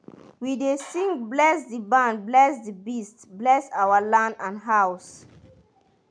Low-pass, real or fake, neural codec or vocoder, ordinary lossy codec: 9.9 kHz; real; none; none